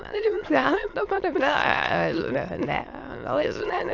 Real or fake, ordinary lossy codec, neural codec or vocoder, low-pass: fake; AAC, 48 kbps; autoencoder, 22.05 kHz, a latent of 192 numbers a frame, VITS, trained on many speakers; 7.2 kHz